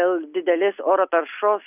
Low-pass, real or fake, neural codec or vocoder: 3.6 kHz; real; none